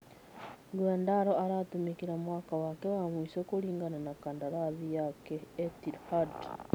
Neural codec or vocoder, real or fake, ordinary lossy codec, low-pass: none; real; none; none